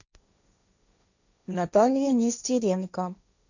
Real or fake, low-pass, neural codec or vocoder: fake; 7.2 kHz; codec, 16 kHz, 1.1 kbps, Voila-Tokenizer